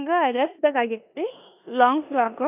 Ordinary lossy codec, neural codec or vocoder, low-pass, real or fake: none; codec, 16 kHz in and 24 kHz out, 0.9 kbps, LongCat-Audio-Codec, four codebook decoder; 3.6 kHz; fake